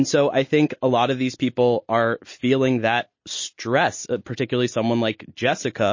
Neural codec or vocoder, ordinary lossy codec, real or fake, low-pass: none; MP3, 32 kbps; real; 7.2 kHz